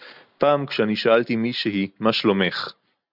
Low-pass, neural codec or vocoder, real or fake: 5.4 kHz; none; real